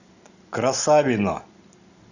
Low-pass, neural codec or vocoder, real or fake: 7.2 kHz; none; real